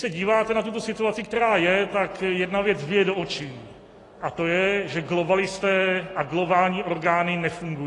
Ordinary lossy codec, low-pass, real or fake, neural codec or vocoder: AAC, 32 kbps; 10.8 kHz; real; none